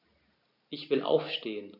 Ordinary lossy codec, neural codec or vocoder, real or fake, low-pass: none; none; real; 5.4 kHz